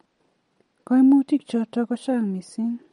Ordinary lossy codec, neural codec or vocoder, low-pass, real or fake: MP3, 48 kbps; none; 10.8 kHz; real